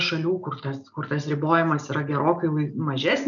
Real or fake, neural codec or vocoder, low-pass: real; none; 7.2 kHz